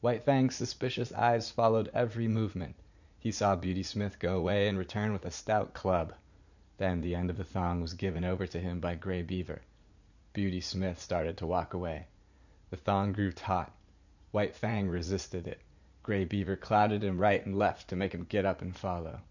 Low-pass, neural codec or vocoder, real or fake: 7.2 kHz; vocoder, 44.1 kHz, 80 mel bands, Vocos; fake